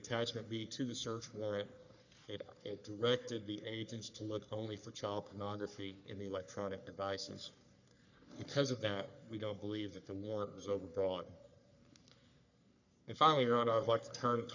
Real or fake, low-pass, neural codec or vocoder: fake; 7.2 kHz; codec, 44.1 kHz, 3.4 kbps, Pupu-Codec